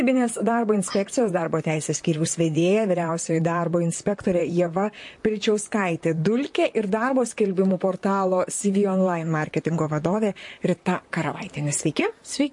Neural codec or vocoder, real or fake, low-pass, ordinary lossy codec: vocoder, 44.1 kHz, 128 mel bands, Pupu-Vocoder; fake; 10.8 kHz; MP3, 48 kbps